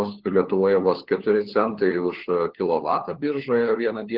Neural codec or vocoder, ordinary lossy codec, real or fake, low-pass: codec, 16 kHz, 4 kbps, FunCodec, trained on LibriTTS, 50 frames a second; Opus, 16 kbps; fake; 5.4 kHz